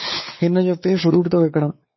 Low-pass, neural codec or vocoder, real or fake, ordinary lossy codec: 7.2 kHz; codec, 16 kHz, 4 kbps, X-Codec, WavLM features, trained on Multilingual LibriSpeech; fake; MP3, 24 kbps